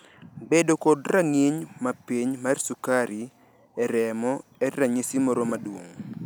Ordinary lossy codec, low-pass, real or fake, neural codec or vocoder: none; none; real; none